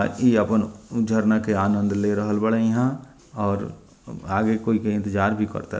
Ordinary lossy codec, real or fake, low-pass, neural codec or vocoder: none; real; none; none